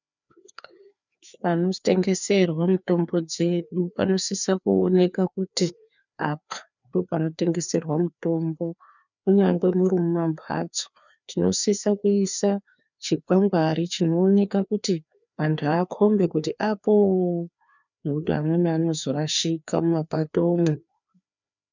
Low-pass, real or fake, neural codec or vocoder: 7.2 kHz; fake; codec, 16 kHz, 2 kbps, FreqCodec, larger model